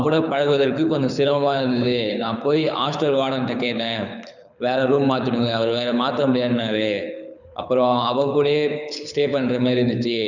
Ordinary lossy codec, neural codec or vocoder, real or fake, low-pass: none; codec, 24 kHz, 6 kbps, HILCodec; fake; 7.2 kHz